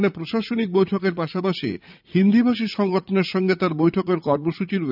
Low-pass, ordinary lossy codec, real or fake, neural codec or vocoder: 5.4 kHz; none; fake; vocoder, 44.1 kHz, 80 mel bands, Vocos